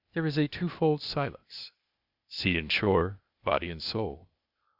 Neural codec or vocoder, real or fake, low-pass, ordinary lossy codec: codec, 16 kHz, 0.8 kbps, ZipCodec; fake; 5.4 kHz; Opus, 64 kbps